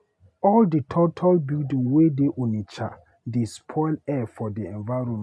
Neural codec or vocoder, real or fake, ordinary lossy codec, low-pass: none; real; none; none